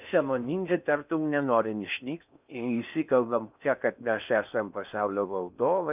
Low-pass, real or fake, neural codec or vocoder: 3.6 kHz; fake; codec, 16 kHz in and 24 kHz out, 0.6 kbps, FocalCodec, streaming, 4096 codes